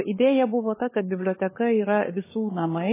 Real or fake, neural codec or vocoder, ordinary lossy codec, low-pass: fake; codec, 16 kHz, 8 kbps, FunCodec, trained on LibriTTS, 25 frames a second; MP3, 16 kbps; 3.6 kHz